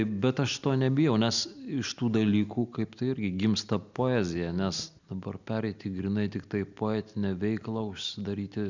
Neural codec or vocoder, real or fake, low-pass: none; real; 7.2 kHz